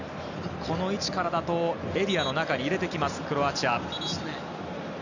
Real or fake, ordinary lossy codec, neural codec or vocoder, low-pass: real; none; none; 7.2 kHz